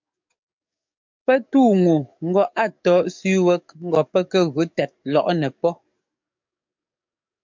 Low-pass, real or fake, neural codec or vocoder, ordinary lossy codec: 7.2 kHz; fake; codec, 44.1 kHz, 7.8 kbps, DAC; MP3, 64 kbps